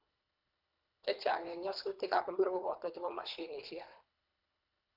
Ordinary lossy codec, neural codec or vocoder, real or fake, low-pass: none; codec, 24 kHz, 3 kbps, HILCodec; fake; 5.4 kHz